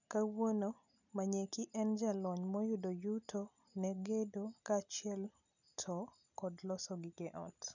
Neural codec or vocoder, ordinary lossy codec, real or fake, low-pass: none; none; real; 7.2 kHz